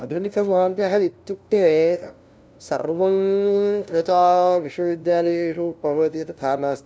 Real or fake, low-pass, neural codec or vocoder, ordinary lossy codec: fake; none; codec, 16 kHz, 0.5 kbps, FunCodec, trained on LibriTTS, 25 frames a second; none